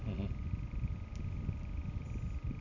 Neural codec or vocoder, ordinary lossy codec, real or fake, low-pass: none; none; real; 7.2 kHz